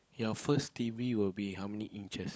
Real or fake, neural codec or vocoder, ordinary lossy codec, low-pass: fake; codec, 16 kHz, 16 kbps, FunCodec, trained on LibriTTS, 50 frames a second; none; none